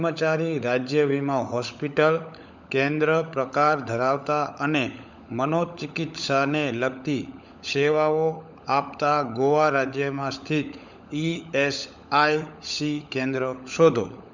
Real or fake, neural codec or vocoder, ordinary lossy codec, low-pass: fake; codec, 16 kHz, 16 kbps, FunCodec, trained on LibriTTS, 50 frames a second; none; 7.2 kHz